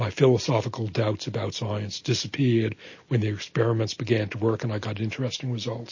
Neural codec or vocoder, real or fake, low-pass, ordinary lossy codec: none; real; 7.2 kHz; MP3, 32 kbps